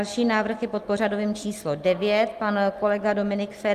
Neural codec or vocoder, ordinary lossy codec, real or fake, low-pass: none; Opus, 32 kbps; real; 14.4 kHz